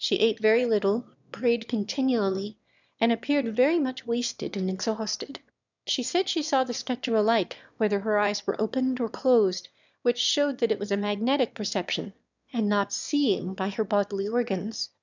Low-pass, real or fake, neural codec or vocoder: 7.2 kHz; fake; autoencoder, 22.05 kHz, a latent of 192 numbers a frame, VITS, trained on one speaker